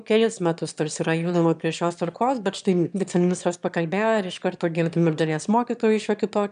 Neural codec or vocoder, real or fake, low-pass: autoencoder, 22.05 kHz, a latent of 192 numbers a frame, VITS, trained on one speaker; fake; 9.9 kHz